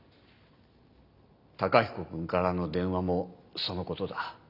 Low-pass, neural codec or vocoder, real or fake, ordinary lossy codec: 5.4 kHz; none; real; none